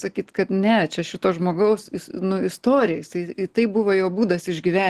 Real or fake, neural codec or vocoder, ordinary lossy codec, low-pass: real; none; Opus, 16 kbps; 14.4 kHz